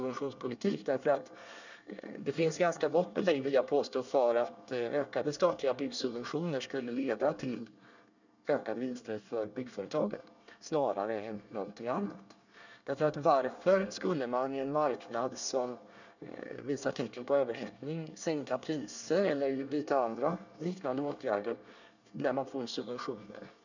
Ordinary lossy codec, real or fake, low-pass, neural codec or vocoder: none; fake; 7.2 kHz; codec, 24 kHz, 1 kbps, SNAC